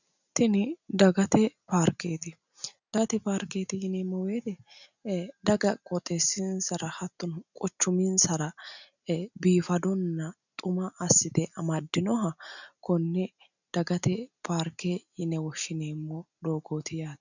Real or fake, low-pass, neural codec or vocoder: real; 7.2 kHz; none